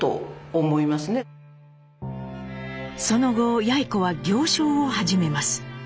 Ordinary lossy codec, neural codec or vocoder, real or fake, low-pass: none; none; real; none